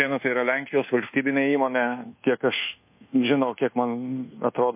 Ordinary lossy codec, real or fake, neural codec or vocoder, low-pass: MP3, 24 kbps; fake; codec, 24 kHz, 1.2 kbps, DualCodec; 3.6 kHz